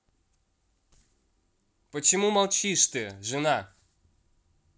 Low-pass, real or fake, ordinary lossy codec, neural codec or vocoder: none; real; none; none